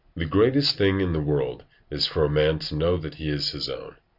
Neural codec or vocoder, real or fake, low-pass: none; real; 5.4 kHz